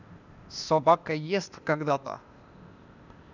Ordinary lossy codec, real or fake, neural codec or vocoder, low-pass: none; fake; codec, 16 kHz, 0.8 kbps, ZipCodec; 7.2 kHz